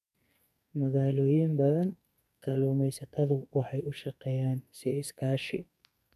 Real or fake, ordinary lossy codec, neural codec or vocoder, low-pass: fake; none; codec, 44.1 kHz, 2.6 kbps, SNAC; 14.4 kHz